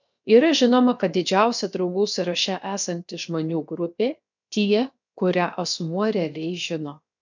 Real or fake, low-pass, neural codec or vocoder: fake; 7.2 kHz; codec, 16 kHz, 0.7 kbps, FocalCodec